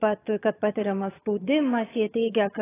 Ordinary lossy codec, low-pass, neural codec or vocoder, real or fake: AAC, 16 kbps; 3.6 kHz; vocoder, 22.05 kHz, 80 mel bands, HiFi-GAN; fake